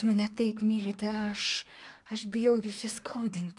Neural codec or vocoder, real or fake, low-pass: codec, 24 kHz, 1 kbps, SNAC; fake; 10.8 kHz